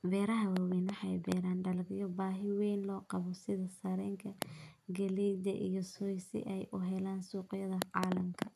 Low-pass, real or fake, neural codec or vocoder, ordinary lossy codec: 14.4 kHz; real; none; none